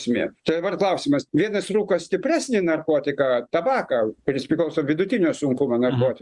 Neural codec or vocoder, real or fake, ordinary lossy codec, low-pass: none; real; Opus, 64 kbps; 10.8 kHz